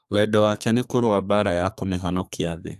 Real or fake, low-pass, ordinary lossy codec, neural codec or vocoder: fake; 14.4 kHz; AAC, 96 kbps; codec, 32 kHz, 1.9 kbps, SNAC